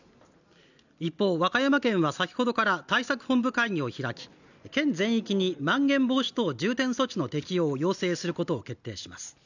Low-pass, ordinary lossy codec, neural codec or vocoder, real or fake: 7.2 kHz; none; none; real